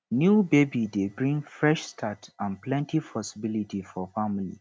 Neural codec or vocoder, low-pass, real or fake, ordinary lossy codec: none; none; real; none